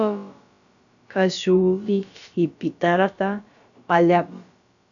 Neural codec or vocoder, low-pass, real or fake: codec, 16 kHz, about 1 kbps, DyCAST, with the encoder's durations; 7.2 kHz; fake